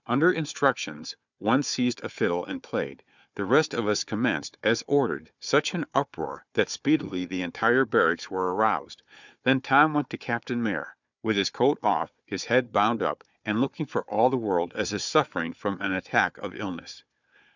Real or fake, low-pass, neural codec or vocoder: fake; 7.2 kHz; codec, 16 kHz, 4 kbps, FunCodec, trained on Chinese and English, 50 frames a second